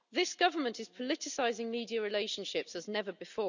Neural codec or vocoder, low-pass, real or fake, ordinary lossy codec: none; 7.2 kHz; real; none